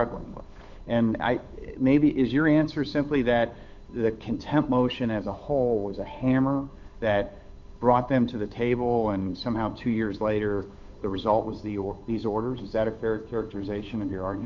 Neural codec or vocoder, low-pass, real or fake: codec, 16 kHz, 8 kbps, FunCodec, trained on Chinese and English, 25 frames a second; 7.2 kHz; fake